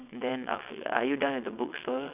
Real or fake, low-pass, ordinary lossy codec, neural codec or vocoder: fake; 3.6 kHz; none; vocoder, 22.05 kHz, 80 mel bands, WaveNeXt